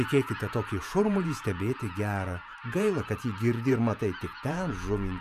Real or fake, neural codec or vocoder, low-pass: fake; vocoder, 48 kHz, 128 mel bands, Vocos; 14.4 kHz